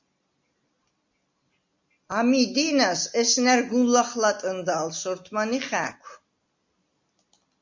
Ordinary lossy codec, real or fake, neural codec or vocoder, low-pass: MP3, 48 kbps; real; none; 7.2 kHz